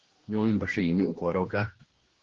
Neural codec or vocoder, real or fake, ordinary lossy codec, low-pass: codec, 16 kHz, 1 kbps, X-Codec, HuBERT features, trained on general audio; fake; Opus, 16 kbps; 7.2 kHz